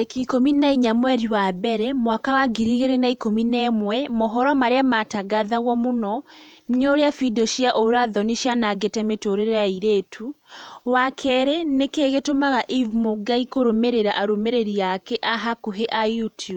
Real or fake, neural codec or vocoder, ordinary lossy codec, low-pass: fake; vocoder, 48 kHz, 128 mel bands, Vocos; none; 19.8 kHz